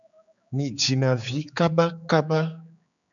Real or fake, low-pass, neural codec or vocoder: fake; 7.2 kHz; codec, 16 kHz, 4 kbps, X-Codec, HuBERT features, trained on general audio